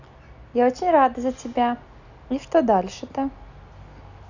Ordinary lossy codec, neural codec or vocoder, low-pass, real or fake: none; none; 7.2 kHz; real